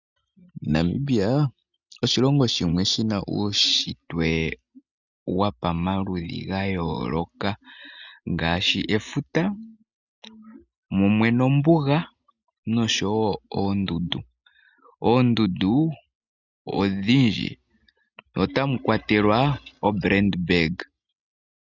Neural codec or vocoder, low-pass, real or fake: none; 7.2 kHz; real